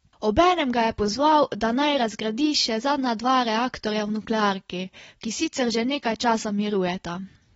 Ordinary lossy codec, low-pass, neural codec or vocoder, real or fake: AAC, 24 kbps; 19.8 kHz; none; real